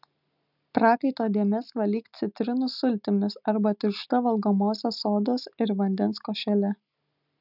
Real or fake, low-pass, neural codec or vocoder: real; 5.4 kHz; none